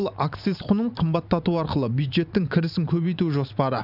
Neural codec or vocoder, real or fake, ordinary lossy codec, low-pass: vocoder, 44.1 kHz, 128 mel bands every 512 samples, BigVGAN v2; fake; none; 5.4 kHz